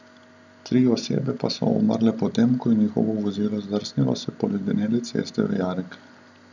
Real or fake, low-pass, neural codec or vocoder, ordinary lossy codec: real; 7.2 kHz; none; none